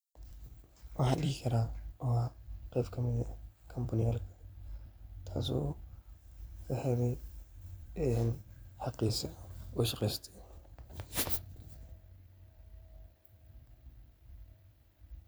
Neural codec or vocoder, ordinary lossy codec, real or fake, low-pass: vocoder, 44.1 kHz, 128 mel bands every 256 samples, BigVGAN v2; none; fake; none